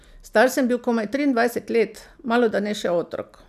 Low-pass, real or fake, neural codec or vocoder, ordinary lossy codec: 14.4 kHz; real; none; none